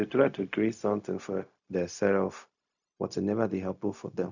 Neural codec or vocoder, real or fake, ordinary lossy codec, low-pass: codec, 16 kHz, 0.4 kbps, LongCat-Audio-Codec; fake; none; 7.2 kHz